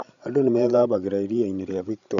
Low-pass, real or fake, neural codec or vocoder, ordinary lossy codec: 7.2 kHz; fake; codec, 16 kHz, 16 kbps, FreqCodec, larger model; MP3, 96 kbps